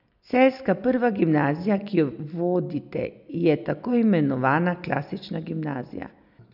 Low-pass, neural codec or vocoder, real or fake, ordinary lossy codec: 5.4 kHz; none; real; none